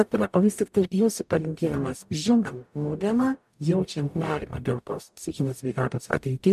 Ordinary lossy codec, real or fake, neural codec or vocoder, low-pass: MP3, 96 kbps; fake; codec, 44.1 kHz, 0.9 kbps, DAC; 14.4 kHz